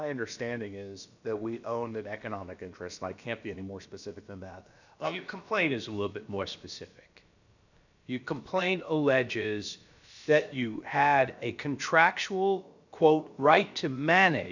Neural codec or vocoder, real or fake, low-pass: codec, 16 kHz, about 1 kbps, DyCAST, with the encoder's durations; fake; 7.2 kHz